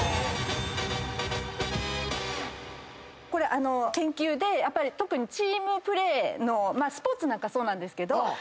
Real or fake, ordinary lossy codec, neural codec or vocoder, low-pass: real; none; none; none